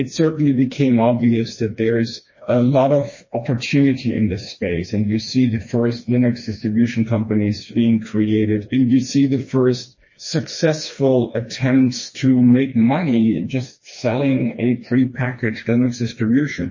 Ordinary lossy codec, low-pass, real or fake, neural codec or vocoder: MP3, 32 kbps; 7.2 kHz; fake; codec, 16 kHz, 2 kbps, FreqCodec, smaller model